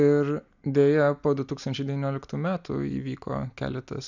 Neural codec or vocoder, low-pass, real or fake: none; 7.2 kHz; real